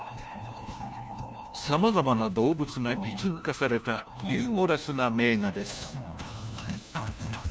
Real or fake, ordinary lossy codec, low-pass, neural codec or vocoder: fake; none; none; codec, 16 kHz, 1 kbps, FunCodec, trained on LibriTTS, 50 frames a second